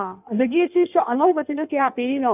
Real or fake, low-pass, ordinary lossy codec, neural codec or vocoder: fake; 3.6 kHz; none; codec, 16 kHz in and 24 kHz out, 1.1 kbps, FireRedTTS-2 codec